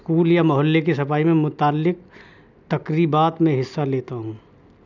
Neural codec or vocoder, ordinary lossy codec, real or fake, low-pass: none; none; real; 7.2 kHz